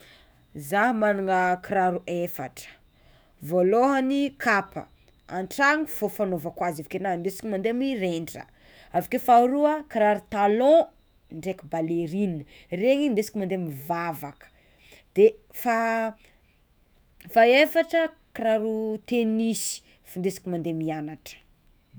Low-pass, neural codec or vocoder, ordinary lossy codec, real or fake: none; autoencoder, 48 kHz, 128 numbers a frame, DAC-VAE, trained on Japanese speech; none; fake